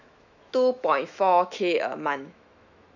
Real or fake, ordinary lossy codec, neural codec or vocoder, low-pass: fake; none; autoencoder, 48 kHz, 128 numbers a frame, DAC-VAE, trained on Japanese speech; 7.2 kHz